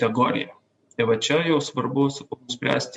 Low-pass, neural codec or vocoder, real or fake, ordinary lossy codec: 10.8 kHz; none; real; MP3, 64 kbps